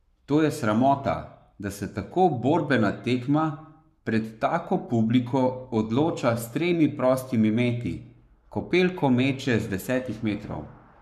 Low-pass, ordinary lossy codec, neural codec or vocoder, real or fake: 14.4 kHz; none; codec, 44.1 kHz, 7.8 kbps, Pupu-Codec; fake